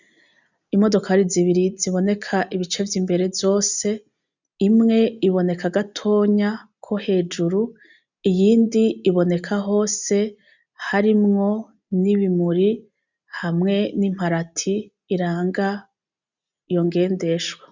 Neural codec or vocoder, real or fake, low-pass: none; real; 7.2 kHz